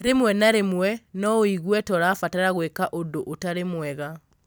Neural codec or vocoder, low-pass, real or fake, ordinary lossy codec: none; none; real; none